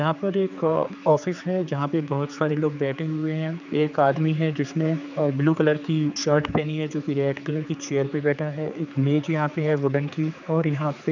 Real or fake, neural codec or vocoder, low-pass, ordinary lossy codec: fake; codec, 16 kHz, 4 kbps, X-Codec, HuBERT features, trained on general audio; 7.2 kHz; none